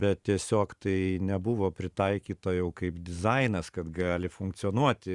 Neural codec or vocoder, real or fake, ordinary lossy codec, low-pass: vocoder, 44.1 kHz, 128 mel bands every 512 samples, BigVGAN v2; fake; Opus, 64 kbps; 10.8 kHz